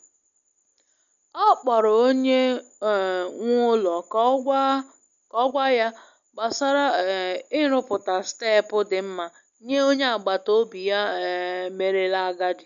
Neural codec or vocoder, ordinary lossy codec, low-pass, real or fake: none; none; 7.2 kHz; real